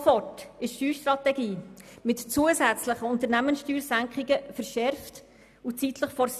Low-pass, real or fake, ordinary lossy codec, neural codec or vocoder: 14.4 kHz; real; none; none